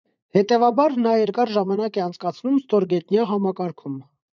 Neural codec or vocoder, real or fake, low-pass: vocoder, 44.1 kHz, 80 mel bands, Vocos; fake; 7.2 kHz